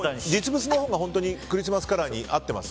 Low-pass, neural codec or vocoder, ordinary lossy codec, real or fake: none; none; none; real